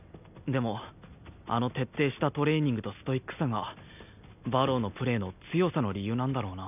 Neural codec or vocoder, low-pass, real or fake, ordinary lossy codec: none; 3.6 kHz; real; none